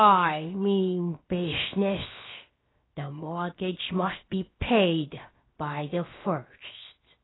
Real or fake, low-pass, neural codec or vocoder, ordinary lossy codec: fake; 7.2 kHz; codec, 16 kHz, 0.7 kbps, FocalCodec; AAC, 16 kbps